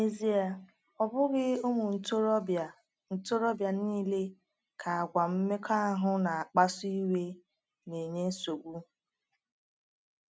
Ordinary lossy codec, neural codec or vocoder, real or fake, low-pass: none; none; real; none